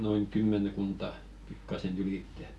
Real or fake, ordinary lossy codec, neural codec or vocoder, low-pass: real; none; none; none